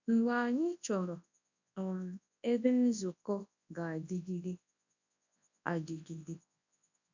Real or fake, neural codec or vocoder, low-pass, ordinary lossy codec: fake; codec, 24 kHz, 0.9 kbps, WavTokenizer, large speech release; 7.2 kHz; none